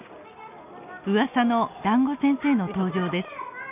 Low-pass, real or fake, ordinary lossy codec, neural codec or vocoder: 3.6 kHz; real; none; none